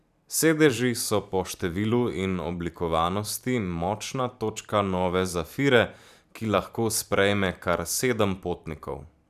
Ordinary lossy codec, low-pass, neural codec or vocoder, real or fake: none; 14.4 kHz; vocoder, 44.1 kHz, 128 mel bands every 512 samples, BigVGAN v2; fake